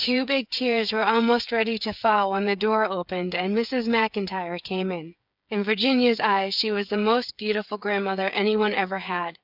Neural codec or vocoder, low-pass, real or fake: codec, 16 kHz, 8 kbps, FreqCodec, smaller model; 5.4 kHz; fake